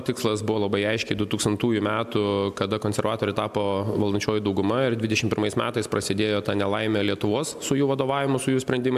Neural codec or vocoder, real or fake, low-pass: none; real; 14.4 kHz